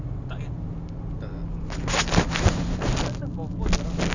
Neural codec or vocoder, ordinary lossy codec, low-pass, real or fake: none; none; 7.2 kHz; real